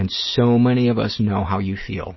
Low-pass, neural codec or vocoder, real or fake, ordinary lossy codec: 7.2 kHz; none; real; MP3, 24 kbps